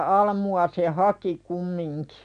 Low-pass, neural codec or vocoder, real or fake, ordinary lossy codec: 9.9 kHz; none; real; none